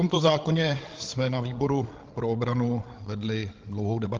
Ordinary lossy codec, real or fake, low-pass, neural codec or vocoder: Opus, 16 kbps; fake; 7.2 kHz; codec, 16 kHz, 16 kbps, FreqCodec, larger model